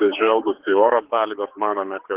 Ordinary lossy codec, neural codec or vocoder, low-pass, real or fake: Opus, 16 kbps; codec, 16 kHz, 4 kbps, X-Codec, HuBERT features, trained on general audio; 3.6 kHz; fake